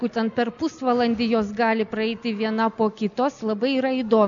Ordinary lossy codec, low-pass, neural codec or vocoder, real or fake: MP3, 96 kbps; 7.2 kHz; none; real